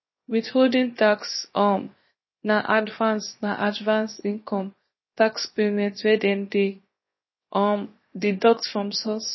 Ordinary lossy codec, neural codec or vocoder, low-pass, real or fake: MP3, 24 kbps; codec, 16 kHz, 0.7 kbps, FocalCodec; 7.2 kHz; fake